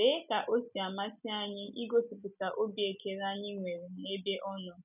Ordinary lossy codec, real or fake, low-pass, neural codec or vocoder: none; real; 3.6 kHz; none